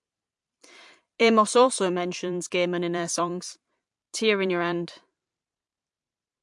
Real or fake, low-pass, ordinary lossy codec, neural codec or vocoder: fake; 10.8 kHz; MP3, 64 kbps; vocoder, 44.1 kHz, 128 mel bands every 512 samples, BigVGAN v2